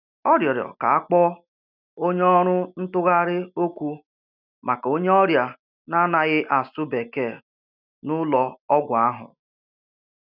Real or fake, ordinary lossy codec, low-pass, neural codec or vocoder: real; none; 5.4 kHz; none